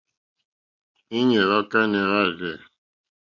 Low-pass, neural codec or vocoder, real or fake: 7.2 kHz; none; real